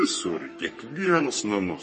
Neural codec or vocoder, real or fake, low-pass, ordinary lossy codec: codec, 44.1 kHz, 3.4 kbps, Pupu-Codec; fake; 10.8 kHz; MP3, 32 kbps